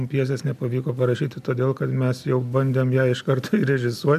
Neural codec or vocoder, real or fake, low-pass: none; real; 14.4 kHz